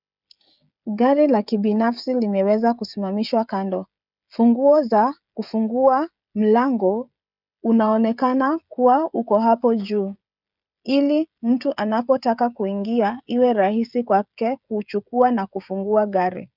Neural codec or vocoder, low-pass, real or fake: codec, 16 kHz, 16 kbps, FreqCodec, smaller model; 5.4 kHz; fake